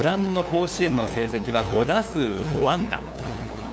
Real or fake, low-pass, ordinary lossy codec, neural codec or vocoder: fake; none; none; codec, 16 kHz, 2 kbps, FunCodec, trained on LibriTTS, 25 frames a second